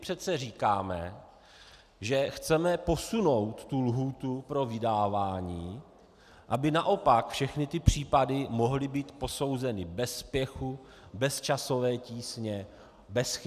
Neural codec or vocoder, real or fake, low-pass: none; real; 14.4 kHz